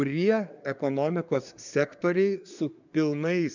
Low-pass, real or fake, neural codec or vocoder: 7.2 kHz; fake; codec, 24 kHz, 1 kbps, SNAC